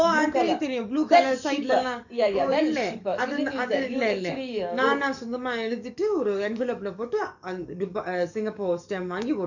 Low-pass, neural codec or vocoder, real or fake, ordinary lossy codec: 7.2 kHz; codec, 44.1 kHz, 7.8 kbps, DAC; fake; none